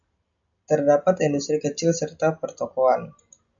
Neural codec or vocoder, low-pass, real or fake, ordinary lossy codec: none; 7.2 kHz; real; AAC, 64 kbps